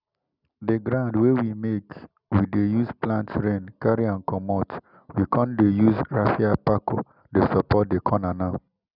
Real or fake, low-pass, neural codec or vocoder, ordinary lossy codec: real; 5.4 kHz; none; none